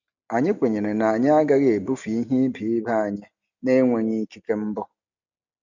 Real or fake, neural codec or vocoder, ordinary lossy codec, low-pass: real; none; none; 7.2 kHz